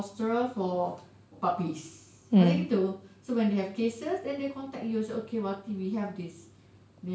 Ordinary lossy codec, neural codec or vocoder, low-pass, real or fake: none; none; none; real